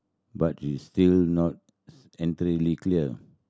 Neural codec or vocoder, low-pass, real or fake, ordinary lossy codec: none; none; real; none